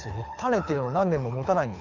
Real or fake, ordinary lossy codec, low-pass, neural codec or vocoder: fake; none; 7.2 kHz; codec, 24 kHz, 6 kbps, HILCodec